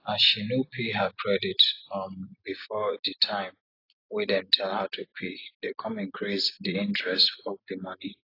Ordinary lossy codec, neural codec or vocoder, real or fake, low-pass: AAC, 32 kbps; none; real; 5.4 kHz